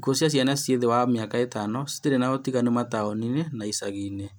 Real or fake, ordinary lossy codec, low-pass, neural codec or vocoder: real; none; none; none